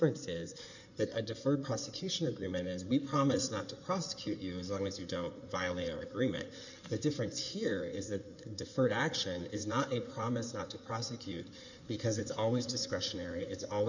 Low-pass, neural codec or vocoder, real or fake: 7.2 kHz; codec, 16 kHz in and 24 kHz out, 2.2 kbps, FireRedTTS-2 codec; fake